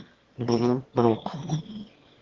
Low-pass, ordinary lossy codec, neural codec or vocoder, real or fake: 7.2 kHz; Opus, 16 kbps; autoencoder, 22.05 kHz, a latent of 192 numbers a frame, VITS, trained on one speaker; fake